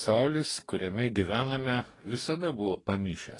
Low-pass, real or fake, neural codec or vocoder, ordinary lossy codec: 10.8 kHz; fake; codec, 44.1 kHz, 2.6 kbps, DAC; AAC, 32 kbps